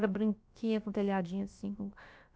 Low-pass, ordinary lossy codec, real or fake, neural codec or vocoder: none; none; fake; codec, 16 kHz, about 1 kbps, DyCAST, with the encoder's durations